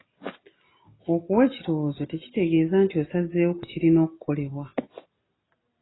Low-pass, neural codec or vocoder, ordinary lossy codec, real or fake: 7.2 kHz; none; AAC, 16 kbps; real